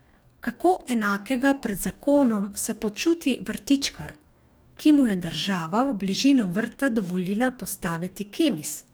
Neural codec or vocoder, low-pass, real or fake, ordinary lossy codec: codec, 44.1 kHz, 2.6 kbps, DAC; none; fake; none